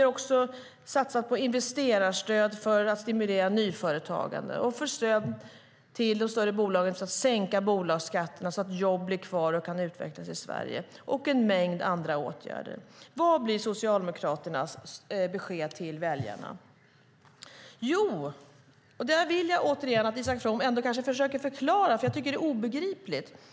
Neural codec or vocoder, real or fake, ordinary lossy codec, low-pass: none; real; none; none